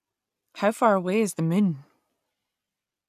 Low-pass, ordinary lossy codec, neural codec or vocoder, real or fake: 14.4 kHz; none; none; real